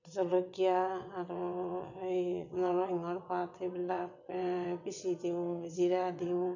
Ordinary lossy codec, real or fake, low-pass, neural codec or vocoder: none; fake; 7.2 kHz; vocoder, 22.05 kHz, 80 mel bands, WaveNeXt